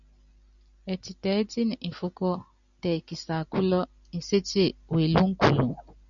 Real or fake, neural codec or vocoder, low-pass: real; none; 7.2 kHz